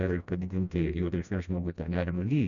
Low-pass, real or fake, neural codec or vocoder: 7.2 kHz; fake; codec, 16 kHz, 1 kbps, FreqCodec, smaller model